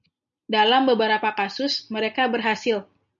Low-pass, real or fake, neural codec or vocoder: 7.2 kHz; real; none